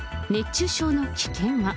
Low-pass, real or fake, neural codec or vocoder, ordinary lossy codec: none; real; none; none